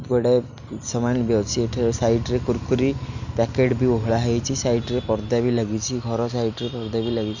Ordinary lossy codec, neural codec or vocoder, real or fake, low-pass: none; none; real; 7.2 kHz